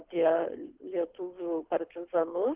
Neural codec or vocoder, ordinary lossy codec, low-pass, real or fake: codec, 24 kHz, 6 kbps, HILCodec; Opus, 16 kbps; 3.6 kHz; fake